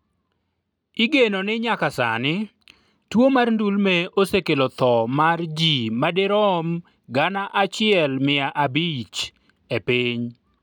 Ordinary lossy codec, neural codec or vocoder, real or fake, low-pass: none; none; real; 19.8 kHz